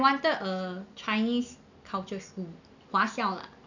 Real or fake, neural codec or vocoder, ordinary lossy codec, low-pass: real; none; none; 7.2 kHz